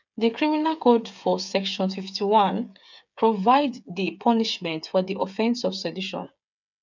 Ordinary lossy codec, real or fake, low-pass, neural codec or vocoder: none; fake; 7.2 kHz; codec, 16 kHz, 8 kbps, FreqCodec, smaller model